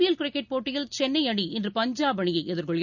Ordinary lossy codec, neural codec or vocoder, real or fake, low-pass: none; none; real; 7.2 kHz